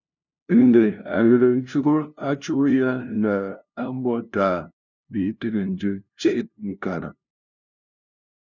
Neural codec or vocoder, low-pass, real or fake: codec, 16 kHz, 0.5 kbps, FunCodec, trained on LibriTTS, 25 frames a second; 7.2 kHz; fake